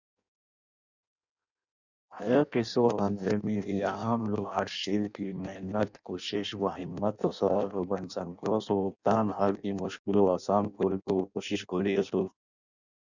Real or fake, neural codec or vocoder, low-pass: fake; codec, 16 kHz in and 24 kHz out, 0.6 kbps, FireRedTTS-2 codec; 7.2 kHz